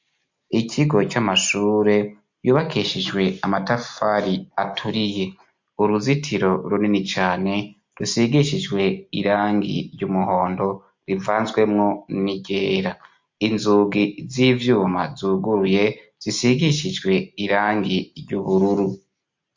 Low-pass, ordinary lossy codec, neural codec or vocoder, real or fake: 7.2 kHz; MP3, 48 kbps; none; real